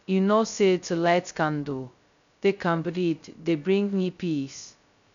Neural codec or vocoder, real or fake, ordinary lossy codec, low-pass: codec, 16 kHz, 0.2 kbps, FocalCodec; fake; none; 7.2 kHz